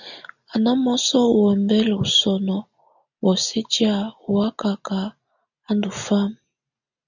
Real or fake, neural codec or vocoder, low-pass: real; none; 7.2 kHz